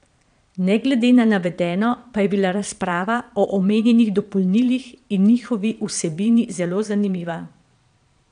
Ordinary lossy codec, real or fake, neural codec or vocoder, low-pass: none; fake; vocoder, 22.05 kHz, 80 mel bands, Vocos; 9.9 kHz